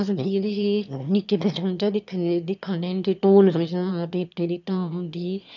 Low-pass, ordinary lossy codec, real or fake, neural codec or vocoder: 7.2 kHz; none; fake; autoencoder, 22.05 kHz, a latent of 192 numbers a frame, VITS, trained on one speaker